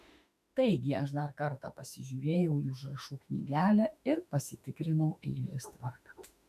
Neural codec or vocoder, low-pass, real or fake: autoencoder, 48 kHz, 32 numbers a frame, DAC-VAE, trained on Japanese speech; 14.4 kHz; fake